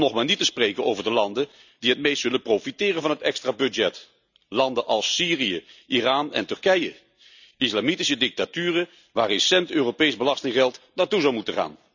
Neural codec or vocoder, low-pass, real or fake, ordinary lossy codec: none; 7.2 kHz; real; none